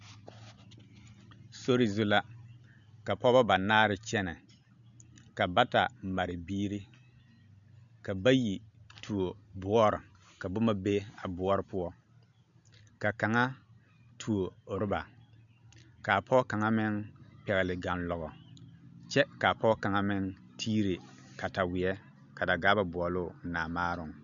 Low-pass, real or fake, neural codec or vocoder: 7.2 kHz; real; none